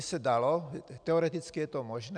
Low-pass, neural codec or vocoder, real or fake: 9.9 kHz; none; real